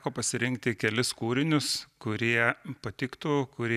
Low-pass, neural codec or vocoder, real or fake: 14.4 kHz; none; real